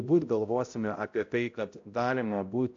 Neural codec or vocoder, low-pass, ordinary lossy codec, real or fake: codec, 16 kHz, 0.5 kbps, X-Codec, HuBERT features, trained on balanced general audio; 7.2 kHz; AAC, 48 kbps; fake